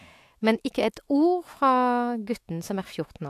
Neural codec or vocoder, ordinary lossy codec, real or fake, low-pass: autoencoder, 48 kHz, 128 numbers a frame, DAC-VAE, trained on Japanese speech; AAC, 64 kbps; fake; 14.4 kHz